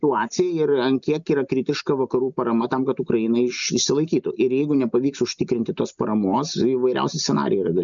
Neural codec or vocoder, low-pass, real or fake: none; 7.2 kHz; real